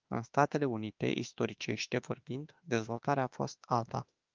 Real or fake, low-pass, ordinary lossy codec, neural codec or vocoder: fake; 7.2 kHz; Opus, 24 kbps; autoencoder, 48 kHz, 32 numbers a frame, DAC-VAE, trained on Japanese speech